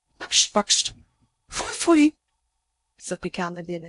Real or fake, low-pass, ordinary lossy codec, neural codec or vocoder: fake; 10.8 kHz; AAC, 64 kbps; codec, 16 kHz in and 24 kHz out, 0.6 kbps, FocalCodec, streaming, 4096 codes